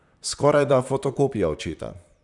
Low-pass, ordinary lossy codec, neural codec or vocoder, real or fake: 10.8 kHz; none; vocoder, 44.1 kHz, 128 mel bands, Pupu-Vocoder; fake